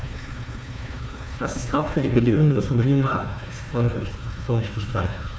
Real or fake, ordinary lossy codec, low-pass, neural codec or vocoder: fake; none; none; codec, 16 kHz, 1 kbps, FunCodec, trained on Chinese and English, 50 frames a second